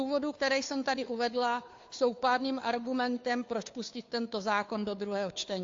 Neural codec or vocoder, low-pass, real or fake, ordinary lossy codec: codec, 16 kHz, 2 kbps, FunCodec, trained on Chinese and English, 25 frames a second; 7.2 kHz; fake; AAC, 48 kbps